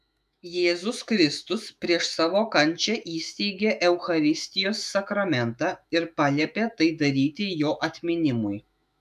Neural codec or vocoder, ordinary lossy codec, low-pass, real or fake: autoencoder, 48 kHz, 128 numbers a frame, DAC-VAE, trained on Japanese speech; MP3, 96 kbps; 14.4 kHz; fake